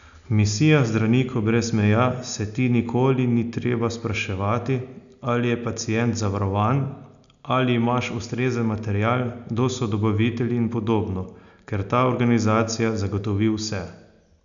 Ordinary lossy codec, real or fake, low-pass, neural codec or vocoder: none; real; 7.2 kHz; none